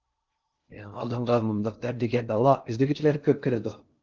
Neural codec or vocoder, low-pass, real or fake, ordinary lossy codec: codec, 16 kHz in and 24 kHz out, 0.6 kbps, FocalCodec, streaming, 2048 codes; 7.2 kHz; fake; Opus, 32 kbps